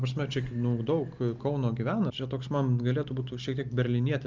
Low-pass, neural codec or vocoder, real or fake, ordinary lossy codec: 7.2 kHz; none; real; Opus, 32 kbps